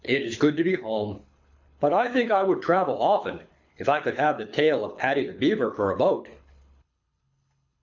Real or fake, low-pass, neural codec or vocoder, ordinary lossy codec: fake; 7.2 kHz; codec, 24 kHz, 6 kbps, HILCodec; MP3, 64 kbps